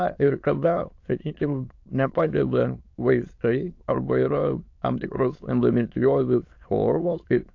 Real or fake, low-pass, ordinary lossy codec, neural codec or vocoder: fake; 7.2 kHz; AAC, 48 kbps; autoencoder, 22.05 kHz, a latent of 192 numbers a frame, VITS, trained on many speakers